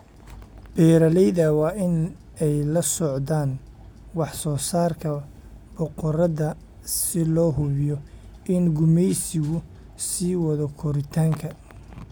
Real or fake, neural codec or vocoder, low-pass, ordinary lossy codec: real; none; none; none